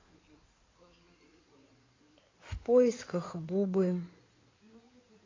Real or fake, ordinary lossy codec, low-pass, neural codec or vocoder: fake; AAC, 32 kbps; 7.2 kHz; codec, 16 kHz in and 24 kHz out, 2.2 kbps, FireRedTTS-2 codec